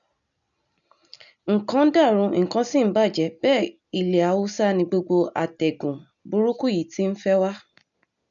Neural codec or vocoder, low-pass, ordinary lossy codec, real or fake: none; 7.2 kHz; none; real